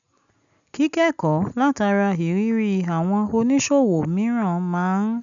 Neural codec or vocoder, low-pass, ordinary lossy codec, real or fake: none; 7.2 kHz; none; real